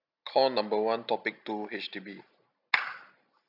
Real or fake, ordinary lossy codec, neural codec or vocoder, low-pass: real; none; none; 5.4 kHz